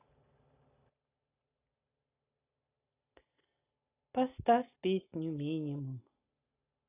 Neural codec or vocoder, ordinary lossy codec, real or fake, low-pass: none; AAC, 24 kbps; real; 3.6 kHz